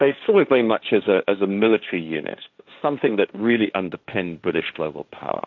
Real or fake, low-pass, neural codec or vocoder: fake; 7.2 kHz; codec, 16 kHz, 1.1 kbps, Voila-Tokenizer